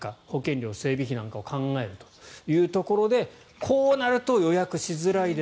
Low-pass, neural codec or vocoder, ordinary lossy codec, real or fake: none; none; none; real